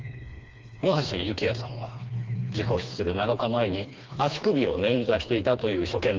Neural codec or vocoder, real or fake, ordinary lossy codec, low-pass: codec, 16 kHz, 2 kbps, FreqCodec, smaller model; fake; Opus, 32 kbps; 7.2 kHz